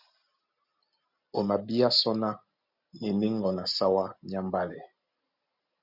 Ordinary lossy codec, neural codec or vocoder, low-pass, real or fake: Opus, 64 kbps; none; 5.4 kHz; real